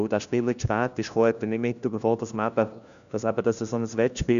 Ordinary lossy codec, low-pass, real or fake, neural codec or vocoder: none; 7.2 kHz; fake; codec, 16 kHz, 1 kbps, FunCodec, trained on LibriTTS, 50 frames a second